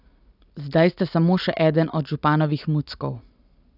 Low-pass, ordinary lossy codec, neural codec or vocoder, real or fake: 5.4 kHz; none; none; real